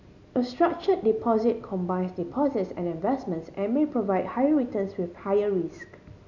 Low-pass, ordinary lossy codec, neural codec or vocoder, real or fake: 7.2 kHz; none; none; real